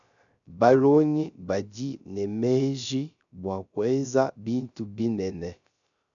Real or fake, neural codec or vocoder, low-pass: fake; codec, 16 kHz, 0.3 kbps, FocalCodec; 7.2 kHz